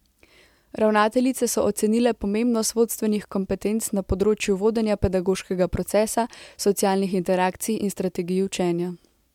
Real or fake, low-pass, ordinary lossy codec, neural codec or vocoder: real; 19.8 kHz; MP3, 96 kbps; none